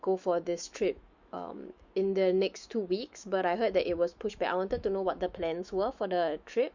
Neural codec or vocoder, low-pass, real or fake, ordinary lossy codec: autoencoder, 48 kHz, 128 numbers a frame, DAC-VAE, trained on Japanese speech; 7.2 kHz; fake; Opus, 64 kbps